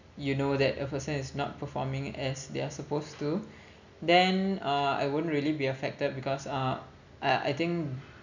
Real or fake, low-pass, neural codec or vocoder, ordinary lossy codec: real; 7.2 kHz; none; none